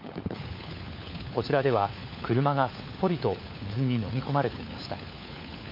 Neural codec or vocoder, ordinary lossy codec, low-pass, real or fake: codec, 16 kHz, 4 kbps, FunCodec, trained on LibriTTS, 50 frames a second; MP3, 32 kbps; 5.4 kHz; fake